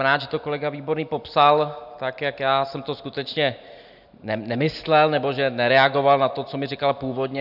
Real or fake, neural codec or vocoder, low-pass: real; none; 5.4 kHz